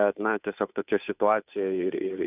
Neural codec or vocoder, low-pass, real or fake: codec, 16 kHz, 2 kbps, FunCodec, trained on Chinese and English, 25 frames a second; 3.6 kHz; fake